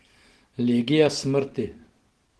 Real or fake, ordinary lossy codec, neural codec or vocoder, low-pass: real; Opus, 16 kbps; none; 10.8 kHz